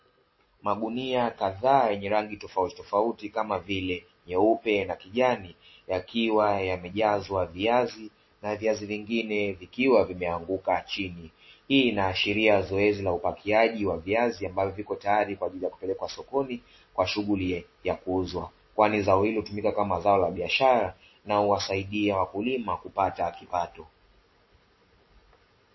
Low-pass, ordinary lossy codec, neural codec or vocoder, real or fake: 7.2 kHz; MP3, 24 kbps; none; real